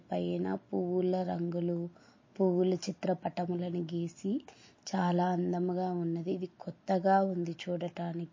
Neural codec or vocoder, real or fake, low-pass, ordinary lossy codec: none; real; 7.2 kHz; MP3, 32 kbps